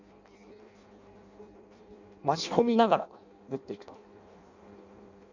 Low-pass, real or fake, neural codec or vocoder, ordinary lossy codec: 7.2 kHz; fake; codec, 16 kHz in and 24 kHz out, 0.6 kbps, FireRedTTS-2 codec; none